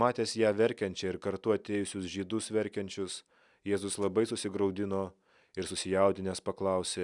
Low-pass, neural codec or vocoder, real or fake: 10.8 kHz; none; real